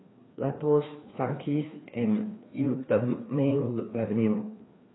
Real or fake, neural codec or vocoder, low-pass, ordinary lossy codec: fake; codec, 16 kHz, 2 kbps, FreqCodec, larger model; 7.2 kHz; AAC, 16 kbps